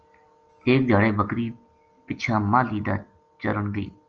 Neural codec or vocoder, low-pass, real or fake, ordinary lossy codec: none; 7.2 kHz; real; Opus, 24 kbps